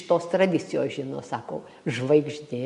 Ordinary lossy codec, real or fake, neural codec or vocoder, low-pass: MP3, 64 kbps; real; none; 10.8 kHz